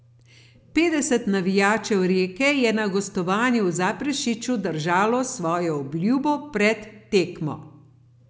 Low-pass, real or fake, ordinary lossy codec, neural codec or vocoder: none; real; none; none